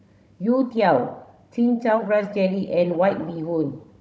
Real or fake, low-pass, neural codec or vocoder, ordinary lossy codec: fake; none; codec, 16 kHz, 16 kbps, FunCodec, trained on Chinese and English, 50 frames a second; none